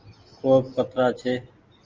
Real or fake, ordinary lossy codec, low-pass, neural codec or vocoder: real; Opus, 32 kbps; 7.2 kHz; none